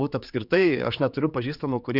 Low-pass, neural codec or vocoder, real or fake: 5.4 kHz; codec, 16 kHz, 4 kbps, X-Codec, HuBERT features, trained on general audio; fake